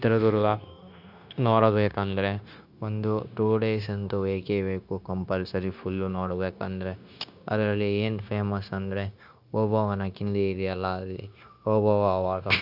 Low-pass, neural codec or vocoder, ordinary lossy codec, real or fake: 5.4 kHz; codec, 16 kHz, 0.9 kbps, LongCat-Audio-Codec; none; fake